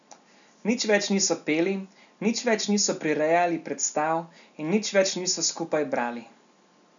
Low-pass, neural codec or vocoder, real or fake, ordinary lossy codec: 7.2 kHz; none; real; none